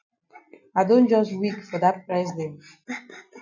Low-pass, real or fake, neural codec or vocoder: 7.2 kHz; real; none